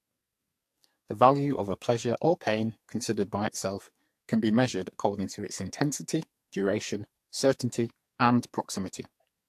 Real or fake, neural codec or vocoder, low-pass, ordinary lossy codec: fake; codec, 44.1 kHz, 2.6 kbps, SNAC; 14.4 kHz; AAC, 64 kbps